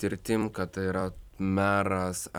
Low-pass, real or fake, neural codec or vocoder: 19.8 kHz; fake; vocoder, 44.1 kHz, 128 mel bands every 256 samples, BigVGAN v2